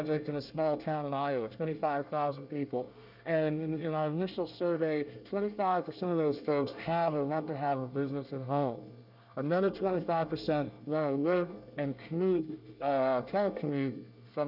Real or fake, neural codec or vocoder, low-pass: fake; codec, 24 kHz, 1 kbps, SNAC; 5.4 kHz